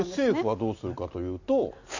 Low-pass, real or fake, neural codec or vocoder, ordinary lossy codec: 7.2 kHz; real; none; none